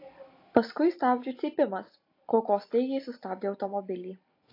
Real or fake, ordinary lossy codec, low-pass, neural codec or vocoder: real; AAC, 32 kbps; 5.4 kHz; none